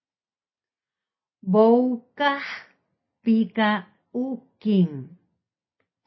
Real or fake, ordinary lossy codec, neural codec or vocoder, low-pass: real; MP3, 24 kbps; none; 7.2 kHz